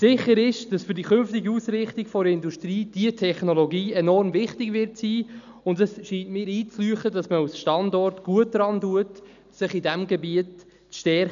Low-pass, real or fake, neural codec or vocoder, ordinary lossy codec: 7.2 kHz; real; none; none